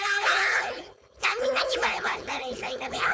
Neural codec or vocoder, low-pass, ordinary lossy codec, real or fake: codec, 16 kHz, 4.8 kbps, FACodec; none; none; fake